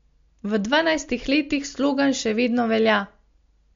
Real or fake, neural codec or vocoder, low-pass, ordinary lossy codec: real; none; 7.2 kHz; MP3, 48 kbps